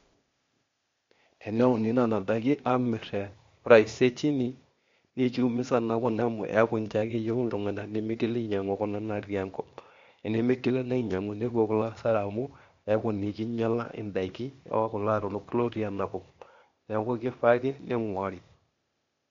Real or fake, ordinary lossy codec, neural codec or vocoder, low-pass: fake; MP3, 48 kbps; codec, 16 kHz, 0.8 kbps, ZipCodec; 7.2 kHz